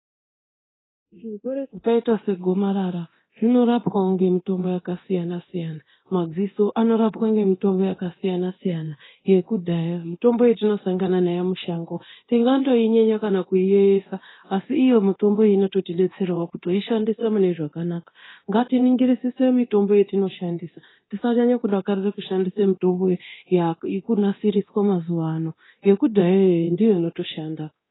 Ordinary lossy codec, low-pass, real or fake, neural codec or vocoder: AAC, 16 kbps; 7.2 kHz; fake; codec, 24 kHz, 0.9 kbps, DualCodec